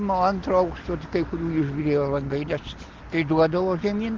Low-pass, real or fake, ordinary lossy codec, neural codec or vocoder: 7.2 kHz; real; Opus, 16 kbps; none